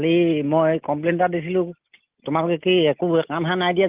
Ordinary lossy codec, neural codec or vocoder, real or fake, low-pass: Opus, 24 kbps; none; real; 3.6 kHz